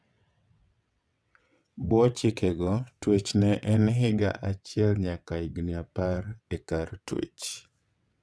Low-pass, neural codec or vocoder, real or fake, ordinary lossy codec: none; vocoder, 22.05 kHz, 80 mel bands, WaveNeXt; fake; none